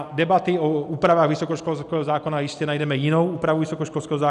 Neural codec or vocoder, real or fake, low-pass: none; real; 10.8 kHz